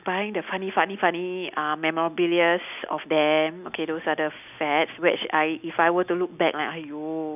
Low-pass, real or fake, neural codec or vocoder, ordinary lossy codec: 3.6 kHz; real; none; none